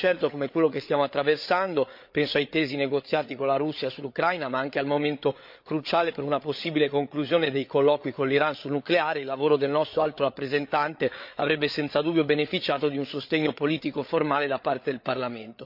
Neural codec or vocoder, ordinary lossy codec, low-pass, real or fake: codec, 16 kHz, 8 kbps, FreqCodec, larger model; MP3, 48 kbps; 5.4 kHz; fake